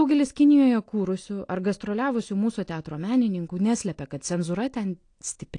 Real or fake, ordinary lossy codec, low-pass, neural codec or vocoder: real; AAC, 48 kbps; 9.9 kHz; none